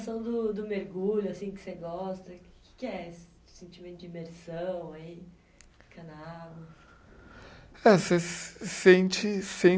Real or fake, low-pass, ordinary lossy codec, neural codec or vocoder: real; none; none; none